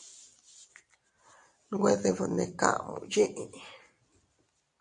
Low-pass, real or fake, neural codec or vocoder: 10.8 kHz; real; none